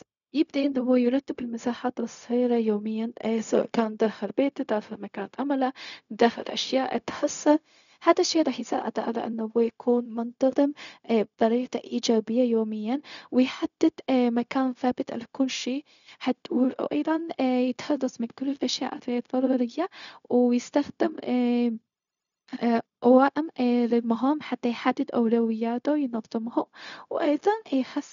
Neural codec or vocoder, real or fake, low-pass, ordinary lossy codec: codec, 16 kHz, 0.4 kbps, LongCat-Audio-Codec; fake; 7.2 kHz; none